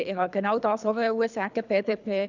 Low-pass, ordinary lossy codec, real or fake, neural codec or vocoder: 7.2 kHz; none; fake; codec, 24 kHz, 3 kbps, HILCodec